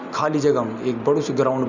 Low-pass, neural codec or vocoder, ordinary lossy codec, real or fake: 7.2 kHz; none; Opus, 64 kbps; real